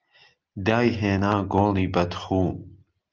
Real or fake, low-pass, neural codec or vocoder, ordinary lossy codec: real; 7.2 kHz; none; Opus, 24 kbps